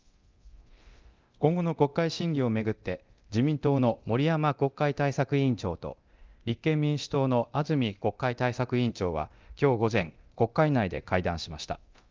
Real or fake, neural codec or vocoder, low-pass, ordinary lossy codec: fake; codec, 24 kHz, 0.9 kbps, DualCodec; 7.2 kHz; Opus, 24 kbps